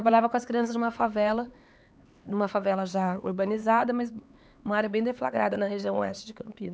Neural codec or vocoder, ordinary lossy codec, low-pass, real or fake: codec, 16 kHz, 4 kbps, X-Codec, HuBERT features, trained on LibriSpeech; none; none; fake